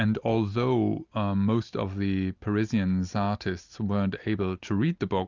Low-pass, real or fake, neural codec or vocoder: 7.2 kHz; real; none